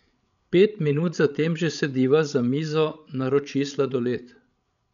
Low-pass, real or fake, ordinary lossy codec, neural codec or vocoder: 7.2 kHz; fake; none; codec, 16 kHz, 16 kbps, FreqCodec, larger model